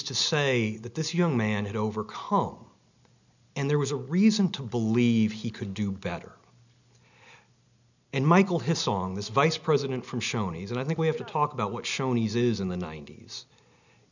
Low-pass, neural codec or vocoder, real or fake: 7.2 kHz; none; real